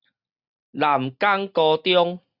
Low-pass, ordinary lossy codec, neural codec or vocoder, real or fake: 5.4 kHz; Opus, 64 kbps; none; real